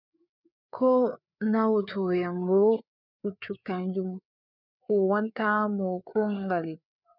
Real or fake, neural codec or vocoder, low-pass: fake; codec, 16 kHz, 4 kbps, FreqCodec, larger model; 5.4 kHz